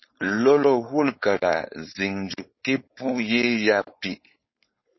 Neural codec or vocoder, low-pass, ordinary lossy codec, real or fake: codec, 16 kHz, 4.8 kbps, FACodec; 7.2 kHz; MP3, 24 kbps; fake